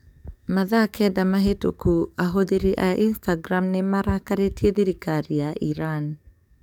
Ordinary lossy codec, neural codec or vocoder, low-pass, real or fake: none; codec, 44.1 kHz, 7.8 kbps, DAC; 19.8 kHz; fake